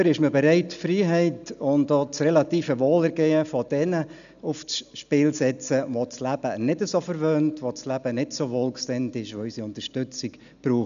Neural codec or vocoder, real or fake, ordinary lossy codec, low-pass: none; real; none; 7.2 kHz